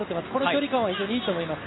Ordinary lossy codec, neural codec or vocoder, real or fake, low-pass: AAC, 16 kbps; none; real; 7.2 kHz